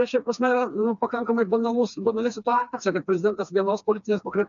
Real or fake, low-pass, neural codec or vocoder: fake; 7.2 kHz; codec, 16 kHz, 2 kbps, FreqCodec, smaller model